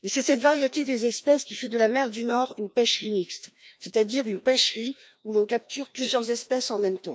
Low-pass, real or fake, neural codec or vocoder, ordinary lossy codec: none; fake; codec, 16 kHz, 1 kbps, FreqCodec, larger model; none